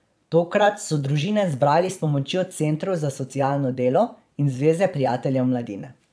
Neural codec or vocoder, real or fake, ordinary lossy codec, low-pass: vocoder, 22.05 kHz, 80 mel bands, Vocos; fake; none; none